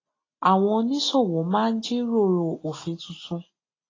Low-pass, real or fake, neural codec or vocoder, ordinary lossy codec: 7.2 kHz; real; none; AAC, 32 kbps